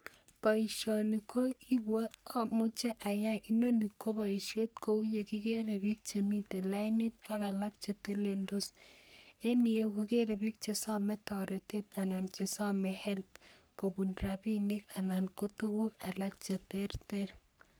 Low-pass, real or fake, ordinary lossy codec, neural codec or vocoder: none; fake; none; codec, 44.1 kHz, 3.4 kbps, Pupu-Codec